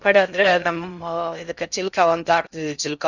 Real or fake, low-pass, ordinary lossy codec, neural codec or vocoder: fake; 7.2 kHz; none; codec, 16 kHz in and 24 kHz out, 0.6 kbps, FocalCodec, streaming, 2048 codes